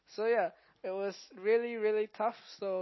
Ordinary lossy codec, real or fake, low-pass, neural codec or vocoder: MP3, 24 kbps; real; 7.2 kHz; none